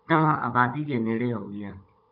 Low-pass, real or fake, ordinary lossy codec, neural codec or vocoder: 5.4 kHz; fake; AAC, 48 kbps; codec, 16 kHz, 16 kbps, FunCodec, trained on Chinese and English, 50 frames a second